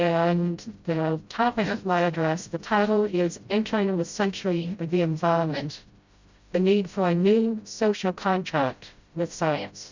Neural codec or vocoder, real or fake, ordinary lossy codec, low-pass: codec, 16 kHz, 0.5 kbps, FreqCodec, smaller model; fake; Opus, 64 kbps; 7.2 kHz